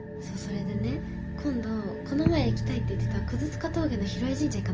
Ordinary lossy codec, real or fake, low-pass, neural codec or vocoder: Opus, 24 kbps; real; 7.2 kHz; none